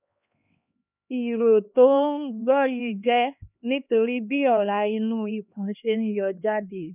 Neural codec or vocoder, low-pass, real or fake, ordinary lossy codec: codec, 16 kHz, 2 kbps, X-Codec, HuBERT features, trained on LibriSpeech; 3.6 kHz; fake; none